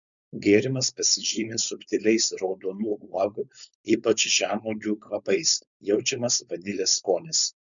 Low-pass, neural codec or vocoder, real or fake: 7.2 kHz; codec, 16 kHz, 4.8 kbps, FACodec; fake